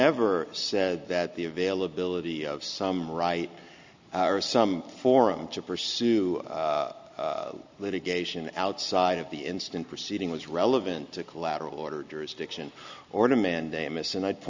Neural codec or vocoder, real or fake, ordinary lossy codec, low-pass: none; real; MP3, 64 kbps; 7.2 kHz